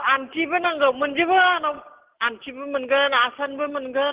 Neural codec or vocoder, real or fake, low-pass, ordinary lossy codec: none; real; 3.6 kHz; Opus, 16 kbps